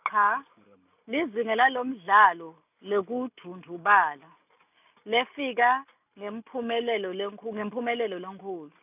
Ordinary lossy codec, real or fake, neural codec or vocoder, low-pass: none; real; none; 3.6 kHz